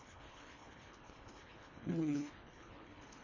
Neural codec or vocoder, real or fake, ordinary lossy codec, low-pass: codec, 24 kHz, 1.5 kbps, HILCodec; fake; MP3, 32 kbps; 7.2 kHz